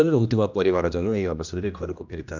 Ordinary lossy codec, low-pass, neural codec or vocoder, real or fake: none; 7.2 kHz; codec, 16 kHz, 1 kbps, X-Codec, HuBERT features, trained on general audio; fake